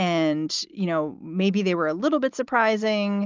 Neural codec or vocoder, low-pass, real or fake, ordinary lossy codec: none; 7.2 kHz; real; Opus, 32 kbps